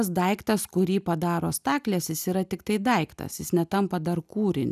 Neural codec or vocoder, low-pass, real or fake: none; 14.4 kHz; real